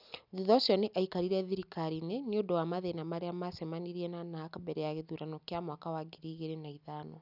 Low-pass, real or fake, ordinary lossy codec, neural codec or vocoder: 5.4 kHz; real; none; none